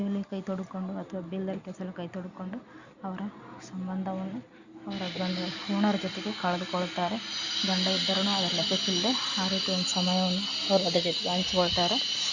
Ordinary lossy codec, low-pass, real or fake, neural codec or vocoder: Opus, 64 kbps; 7.2 kHz; real; none